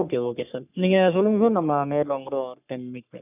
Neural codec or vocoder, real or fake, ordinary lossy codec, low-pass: codec, 44.1 kHz, 3.4 kbps, Pupu-Codec; fake; none; 3.6 kHz